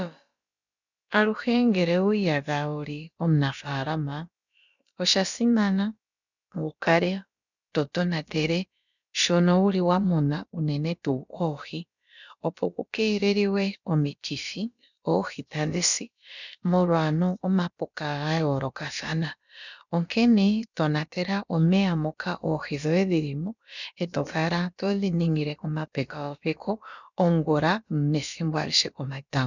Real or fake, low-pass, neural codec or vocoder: fake; 7.2 kHz; codec, 16 kHz, about 1 kbps, DyCAST, with the encoder's durations